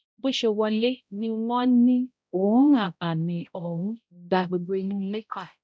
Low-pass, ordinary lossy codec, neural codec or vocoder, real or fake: none; none; codec, 16 kHz, 0.5 kbps, X-Codec, HuBERT features, trained on balanced general audio; fake